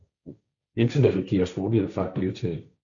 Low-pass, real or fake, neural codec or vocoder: 7.2 kHz; fake; codec, 16 kHz, 1.1 kbps, Voila-Tokenizer